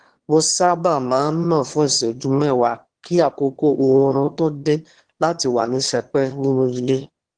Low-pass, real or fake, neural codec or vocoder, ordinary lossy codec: 9.9 kHz; fake; autoencoder, 22.05 kHz, a latent of 192 numbers a frame, VITS, trained on one speaker; Opus, 16 kbps